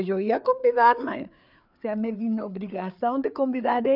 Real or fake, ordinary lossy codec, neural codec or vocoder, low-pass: fake; none; codec, 16 kHz, 4 kbps, FreqCodec, larger model; 5.4 kHz